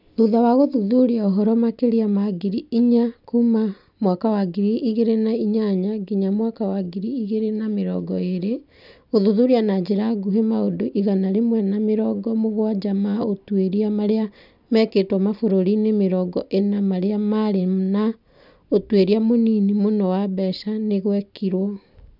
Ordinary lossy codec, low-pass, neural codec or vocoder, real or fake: none; 5.4 kHz; none; real